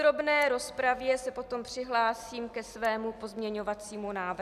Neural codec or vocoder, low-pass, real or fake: none; 14.4 kHz; real